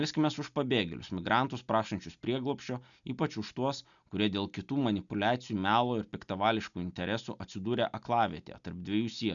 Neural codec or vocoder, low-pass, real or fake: none; 7.2 kHz; real